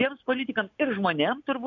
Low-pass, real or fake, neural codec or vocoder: 7.2 kHz; real; none